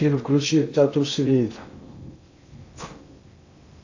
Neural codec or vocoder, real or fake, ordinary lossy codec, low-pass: codec, 16 kHz in and 24 kHz out, 0.6 kbps, FocalCodec, streaming, 2048 codes; fake; AAC, 48 kbps; 7.2 kHz